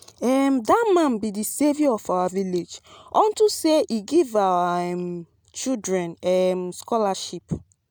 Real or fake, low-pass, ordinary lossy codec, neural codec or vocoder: real; none; none; none